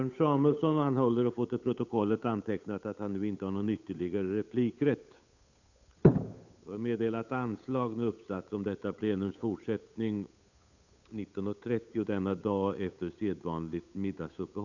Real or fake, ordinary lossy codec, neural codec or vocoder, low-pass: fake; none; codec, 16 kHz, 8 kbps, FunCodec, trained on Chinese and English, 25 frames a second; 7.2 kHz